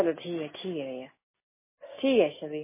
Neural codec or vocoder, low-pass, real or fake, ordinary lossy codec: none; 3.6 kHz; real; MP3, 16 kbps